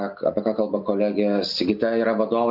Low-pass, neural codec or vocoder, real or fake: 5.4 kHz; none; real